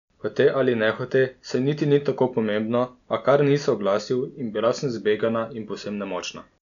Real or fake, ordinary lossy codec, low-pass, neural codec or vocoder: real; none; 7.2 kHz; none